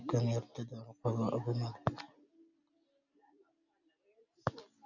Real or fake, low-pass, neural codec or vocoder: real; 7.2 kHz; none